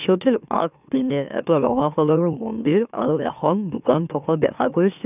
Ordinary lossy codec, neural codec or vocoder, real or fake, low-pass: none; autoencoder, 44.1 kHz, a latent of 192 numbers a frame, MeloTTS; fake; 3.6 kHz